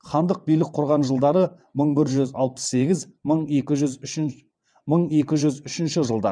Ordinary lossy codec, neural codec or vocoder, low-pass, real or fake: none; vocoder, 22.05 kHz, 80 mel bands, WaveNeXt; 9.9 kHz; fake